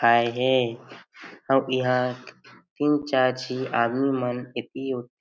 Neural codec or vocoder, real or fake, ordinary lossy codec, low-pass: none; real; none; none